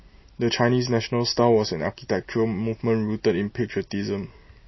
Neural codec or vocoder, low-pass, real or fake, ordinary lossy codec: none; 7.2 kHz; real; MP3, 24 kbps